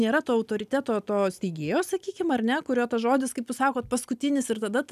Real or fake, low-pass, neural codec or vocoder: real; 14.4 kHz; none